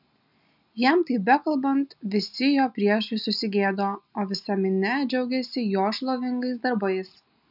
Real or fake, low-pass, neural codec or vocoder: real; 5.4 kHz; none